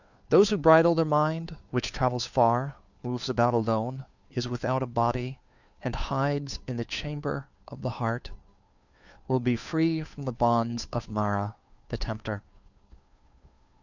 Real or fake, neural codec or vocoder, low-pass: fake; codec, 16 kHz, 2 kbps, FunCodec, trained on Chinese and English, 25 frames a second; 7.2 kHz